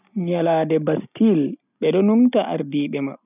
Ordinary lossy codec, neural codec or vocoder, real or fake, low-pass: none; none; real; 3.6 kHz